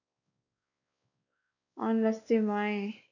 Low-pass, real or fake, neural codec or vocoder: 7.2 kHz; fake; codec, 16 kHz, 2 kbps, X-Codec, WavLM features, trained on Multilingual LibriSpeech